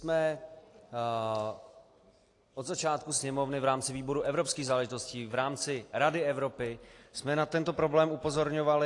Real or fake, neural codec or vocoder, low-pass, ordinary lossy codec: real; none; 10.8 kHz; AAC, 48 kbps